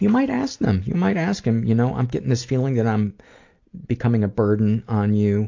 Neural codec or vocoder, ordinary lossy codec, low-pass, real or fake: none; AAC, 48 kbps; 7.2 kHz; real